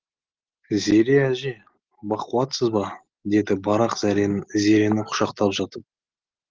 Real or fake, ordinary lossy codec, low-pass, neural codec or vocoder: real; Opus, 16 kbps; 7.2 kHz; none